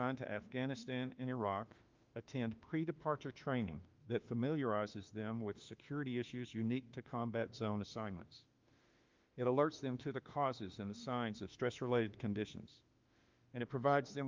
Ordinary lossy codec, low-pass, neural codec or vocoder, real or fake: Opus, 24 kbps; 7.2 kHz; autoencoder, 48 kHz, 32 numbers a frame, DAC-VAE, trained on Japanese speech; fake